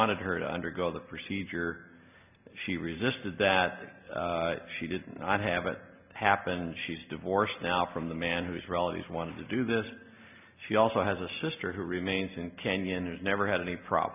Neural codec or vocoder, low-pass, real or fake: none; 3.6 kHz; real